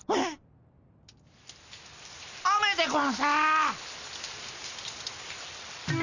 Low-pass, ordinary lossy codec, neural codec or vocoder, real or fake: 7.2 kHz; none; none; real